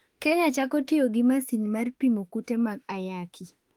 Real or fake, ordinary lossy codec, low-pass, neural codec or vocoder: fake; Opus, 24 kbps; 19.8 kHz; autoencoder, 48 kHz, 32 numbers a frame, DAC-VAE, trained on Japanese speech